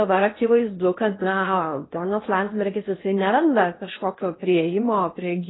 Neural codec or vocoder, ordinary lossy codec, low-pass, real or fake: codec, 16 kHz in and 24 kHz out, 0.8 kbps, FocalCodec, streaming, 65536 codes; AAC, 16 kbps; 7.2 kHz; fake